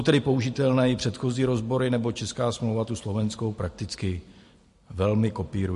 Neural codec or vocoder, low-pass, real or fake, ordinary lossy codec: none; 14.4 kHz; real; MP3, 48 kbps